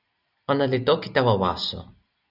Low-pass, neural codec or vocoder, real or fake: 5.4 kHz; none; real